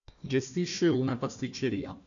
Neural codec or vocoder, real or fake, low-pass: codec, 16 kHz, 1 kbps, FunCodec, trained on Chinese and English, 50 frames a second; fake; 7.2 kHz